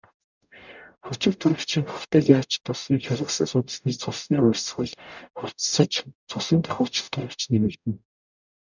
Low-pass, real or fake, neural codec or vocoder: 7.2 kHz; fake; codec, 44.1 kHz, 0.9 kbps, DAC